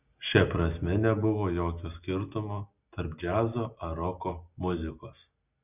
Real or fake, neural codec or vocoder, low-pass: real; none; 3.6 kHz